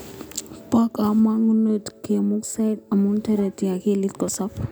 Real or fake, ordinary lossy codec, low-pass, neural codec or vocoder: real; none; none; none